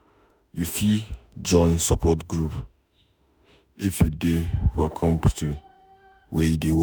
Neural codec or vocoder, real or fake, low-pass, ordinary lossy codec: autoencoder, 48 kHz, 32 numbers a frame, DAC-VAE, trained on Japanese speech; fake; none; none